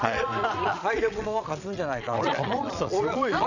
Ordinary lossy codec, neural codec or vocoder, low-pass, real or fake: none; vocoder, 22.05 kHz, 80 mel bands, Vocos; 7.2 kHz; fake